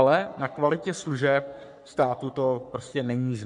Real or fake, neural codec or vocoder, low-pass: fake; codec, 44.1 kHz, 3.4 kbps, Pupu-Codec; 10.8 kHz